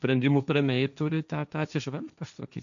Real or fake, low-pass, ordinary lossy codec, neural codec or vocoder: fake; 7.2 kHz; MP3, 96 kbps; codec, 16 kHz, 1.1 kbps, Voila-Tokenizer